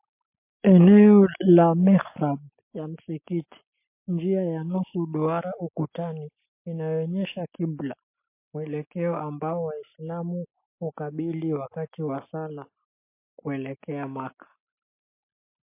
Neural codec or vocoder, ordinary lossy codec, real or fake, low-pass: none; MP3, 24 kbps; real; 3.6 kHz